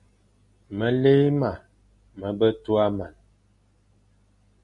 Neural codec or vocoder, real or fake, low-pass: none; real; 10.8 kHz